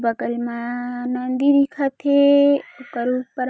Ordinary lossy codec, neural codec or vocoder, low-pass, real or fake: none; none; none; real